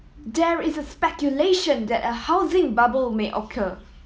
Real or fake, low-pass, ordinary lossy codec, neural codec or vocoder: real; none; none; none